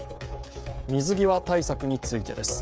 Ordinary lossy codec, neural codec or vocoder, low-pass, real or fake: none; codec, 16 kHz, 16 kbps, FreqCodec, smaller model; none; fake